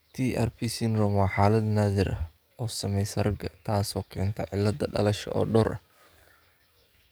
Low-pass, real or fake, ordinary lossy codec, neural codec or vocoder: none; fake; none; codec, 44.1 kHz, 7.8 kbps, DAC